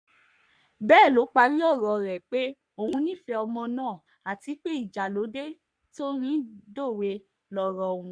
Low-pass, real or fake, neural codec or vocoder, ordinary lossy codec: 9.9 kHz; fake; codec, 44.1 kHz, 3.4 kbps, Pupu-Codec; none